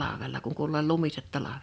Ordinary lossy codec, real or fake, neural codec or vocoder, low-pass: none; real; none; none